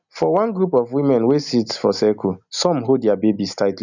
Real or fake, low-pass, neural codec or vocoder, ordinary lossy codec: real; 7.2 kHz; none; none